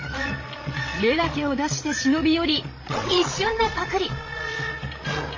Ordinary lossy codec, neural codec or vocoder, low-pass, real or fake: MP3, 32 kbps; codec, 16 kHz, 16 kbps, FreqCodec, larger model; 7.2 kHz; fake